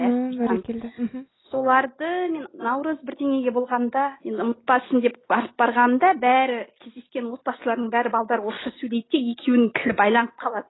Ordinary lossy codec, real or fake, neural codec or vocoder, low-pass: AAC, 16 kbps; real; none; 7.2 kHz